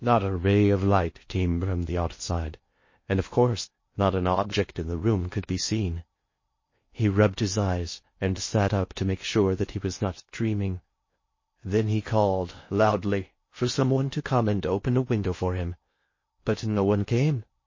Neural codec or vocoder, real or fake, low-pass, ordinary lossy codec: codec, 16 kHz in and 24 kHz out, 0.6 kbps, FocalCodec, streaming, 2048 codes; fake; 7.2 kHz; MP3, 32 kbps